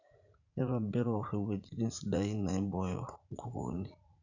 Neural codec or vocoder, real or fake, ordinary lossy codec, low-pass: vocoder, 44.1 kHz, 80 mel bands, Vocos; fake; none; 7.2 kHz